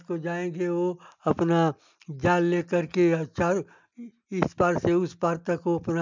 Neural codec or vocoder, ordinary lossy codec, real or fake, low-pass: none; MP3, 64 kbps; real; 7.2 kHz